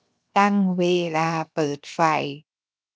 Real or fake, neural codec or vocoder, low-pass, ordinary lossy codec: fake; codec, 16 kHz, 0.7 kbps, FocalCodec; none; none